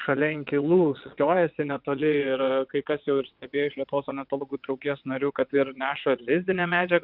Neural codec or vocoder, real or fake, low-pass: vocoder, 22.05 kHz, 80 mel bands, WaveNeXt; fake; 5.4 kHz